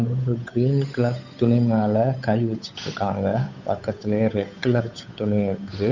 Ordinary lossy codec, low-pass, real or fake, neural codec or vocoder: none; 7.2 kHz; fake; codec, 16 kHz, 8 kbps, FunCodec, trained on Chinese and English, 25 frames a second